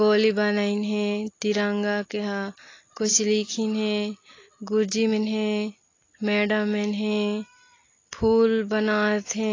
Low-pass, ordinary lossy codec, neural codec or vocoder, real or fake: 7.2 kHz; AAC, 32 kbps; none; real